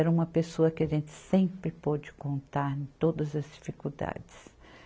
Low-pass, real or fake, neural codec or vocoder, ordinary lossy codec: none; real; none; none